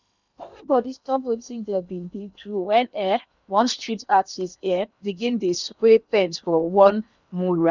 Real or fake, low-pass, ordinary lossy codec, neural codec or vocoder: fake; 7.2 kHz; none; codec, 16 kHz in and 24 kHz out, 0.8 kbps, FocalCodec, streaming, 65536 codes